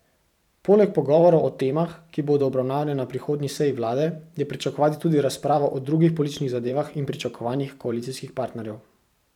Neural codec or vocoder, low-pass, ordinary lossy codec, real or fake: vocoder, 44.1 kHz, 128 mel bands every 512 samples, BigVGAN v2; 19.8 kHz; none; fake